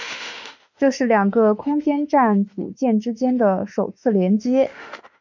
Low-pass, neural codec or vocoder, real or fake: 7.2 kHz; autoencoder, 48 kHz, 32 numbers a frame, DAC-VAE, trained on Japanese speech; fake